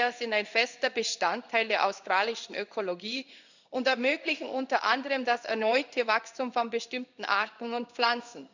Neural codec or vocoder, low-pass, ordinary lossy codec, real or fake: codec, 16 kHz in and 24 kHz out, 1 kbps, XY-Tokenizer; 7.2 kHz; none; fake